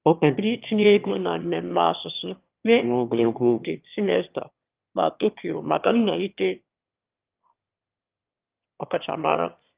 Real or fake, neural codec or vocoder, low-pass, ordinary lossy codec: fake; autoencoder, 22.05 kHz, a latent of 192 numbers a frame, VITS, trained on one speaker; 3.6 kHz; Opus, 32 kbps